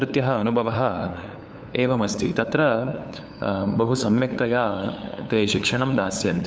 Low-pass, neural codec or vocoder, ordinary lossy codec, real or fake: none; codec, 16 kHz, 8 kbps, FunCodec, trained on LibriTTS, 25 frames a second; none; fake